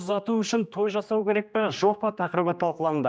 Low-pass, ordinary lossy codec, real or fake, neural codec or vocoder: none; none; fake; codec, 16 kHz, 2 kbps, X-Codec, HuBERT features, trained on general audio